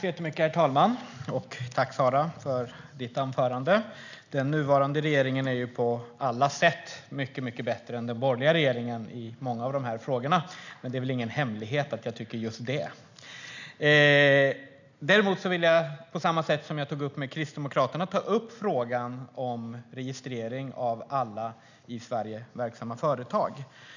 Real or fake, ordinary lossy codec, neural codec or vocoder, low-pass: real; none; none; 7.2 kHz